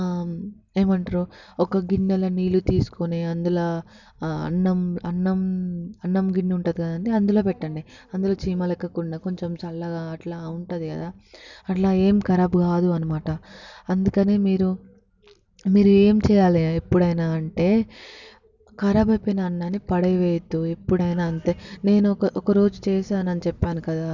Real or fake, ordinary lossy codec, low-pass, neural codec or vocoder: real; none; 7.2 kHz; none